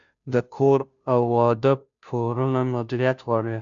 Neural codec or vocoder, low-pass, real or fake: codec, 16 kHz, 0.5 kbps, FunCodec, trained on Chinese and English, 25 frames a second; 7.2 kHz; fake